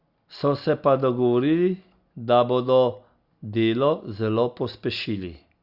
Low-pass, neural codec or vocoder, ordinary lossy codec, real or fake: 5.4 kHz; none; Opus, 64 kbps; real